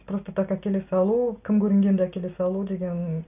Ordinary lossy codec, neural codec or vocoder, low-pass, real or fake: none; none; 3.6 kHz; real